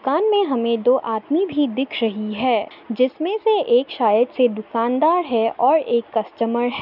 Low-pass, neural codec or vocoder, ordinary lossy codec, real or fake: 5.4 kHz; none; none; real